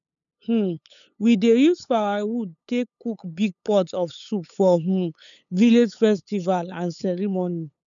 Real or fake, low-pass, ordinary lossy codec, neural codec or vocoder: fake; 7.2 kHz; none; codec, 16 kHz, 8 kbps, FunCodec, trained on LibriTTS, 25 frames a second